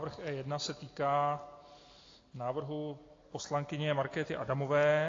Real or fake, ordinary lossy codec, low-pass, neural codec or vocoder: real; AAC, 32 kbps; 7.2 kHz; none